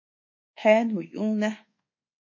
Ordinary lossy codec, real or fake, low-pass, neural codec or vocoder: MP3, 32 kbps; fake; 7.2 kHz; codec, 24 kHz, 1.2 kbps, DualCodec